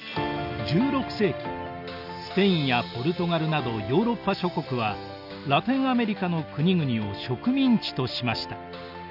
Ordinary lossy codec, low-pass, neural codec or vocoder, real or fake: none; 5.4 kHz; none; real